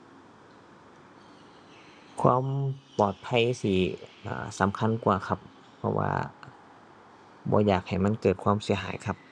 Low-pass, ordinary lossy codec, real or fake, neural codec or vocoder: 9.9 kHz; none; real; none